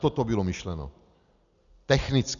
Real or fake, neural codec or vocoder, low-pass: real; none; 7.2 kHz